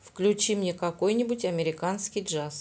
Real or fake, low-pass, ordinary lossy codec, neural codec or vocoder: real; none; none; none